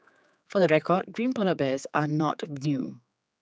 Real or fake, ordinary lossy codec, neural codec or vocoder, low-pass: fake; none; codec, 16 kHz, 4 kbps, X-Codec, HuBERT features, trained on general audio; none